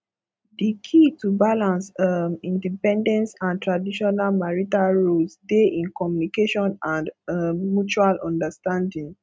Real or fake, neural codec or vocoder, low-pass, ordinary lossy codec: real; none; none; none